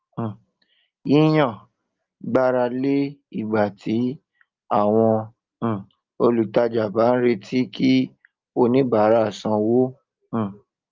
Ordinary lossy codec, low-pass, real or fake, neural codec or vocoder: Opus, 32 kbps; 7.2 kHz; real; none